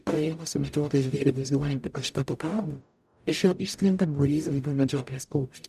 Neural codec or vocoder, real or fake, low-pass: codec, 44.1 kHz, 0.9 kbps, DAC; fake; 14.4 kHz